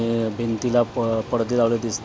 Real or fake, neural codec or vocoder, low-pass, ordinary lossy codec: real; none; none; none